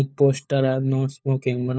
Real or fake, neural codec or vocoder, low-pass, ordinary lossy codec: fake; codec, 16 kHz, 4 kbps, FunCodec, trained on LibriTTS, 50 frames a second; none; none